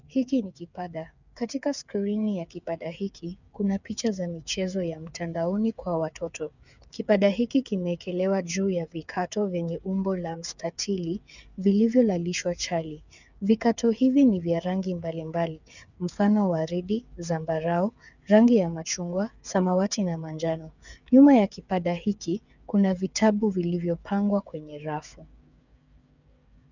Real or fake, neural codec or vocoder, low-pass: fake; codec, 16 kHz, 8 kbps, FreqCodec, smaller model; 7.2 kHz